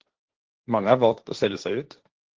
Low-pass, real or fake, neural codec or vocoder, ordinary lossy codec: 7.2 kHz; fake; codec, 16 kHz, 1.1 kbps, Voila-Tokenizer; Opus, 16 kbps